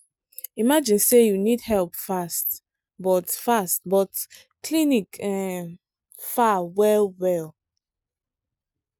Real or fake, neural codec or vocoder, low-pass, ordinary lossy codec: real; none; none; none